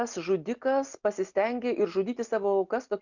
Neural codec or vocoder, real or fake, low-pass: none; real; 7.2 kHz